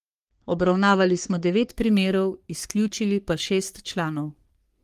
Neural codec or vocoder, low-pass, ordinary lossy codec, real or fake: codec, 44.1 kHz, 3.4 kbps, Pupu-Codec; 14.4 kHz; Opus, 24 kbps; fake